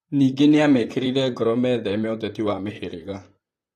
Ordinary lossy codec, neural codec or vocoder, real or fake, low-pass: AAC, 48 kbps; vocoder, 44.1 kHz, 128 mel bands, Pupu-Vocoder; fake; 14.4 kHz